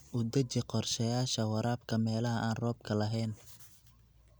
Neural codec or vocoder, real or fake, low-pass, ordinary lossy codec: none; real; none; none